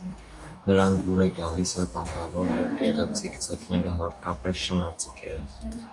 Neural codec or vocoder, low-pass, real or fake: codec, 44.1 kHz, 2.6 kbps, DAC; 10.8 kHz; fake